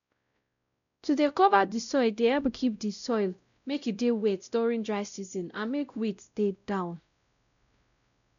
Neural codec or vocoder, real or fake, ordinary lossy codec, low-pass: codec, 16 kHz, 0.5 kbps, X-Codec, WavLM features, trained on Multilingual LibriSpeech; fake; none; 7.2 kHz